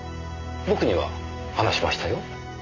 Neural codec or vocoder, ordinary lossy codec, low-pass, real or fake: none; none; 7.2 kHz; real